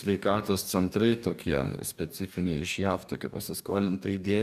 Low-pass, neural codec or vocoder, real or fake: 14.4 kHz; codec, 44.1 kHz, 2.6 kbps, DAC; fake